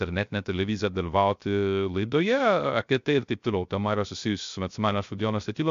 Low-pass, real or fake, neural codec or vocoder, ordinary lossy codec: 7.2 kHz; fake; codec, 16 kHz, 0.3 kbps, FocalCodec; MP3, 64 kbps